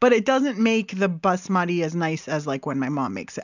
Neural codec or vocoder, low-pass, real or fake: none; 7.2 kHz; real